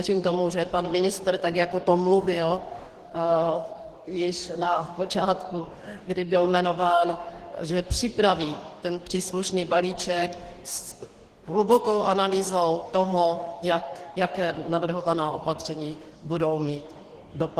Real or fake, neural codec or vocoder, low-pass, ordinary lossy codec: fake; codec, 44.1 kHz, 2.6 kbps, DAC; 14.4 kHz; Opus, 16 kbps